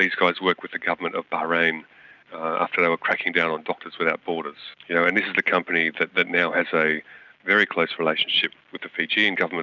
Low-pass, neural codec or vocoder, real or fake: 7.2 kHz; none; real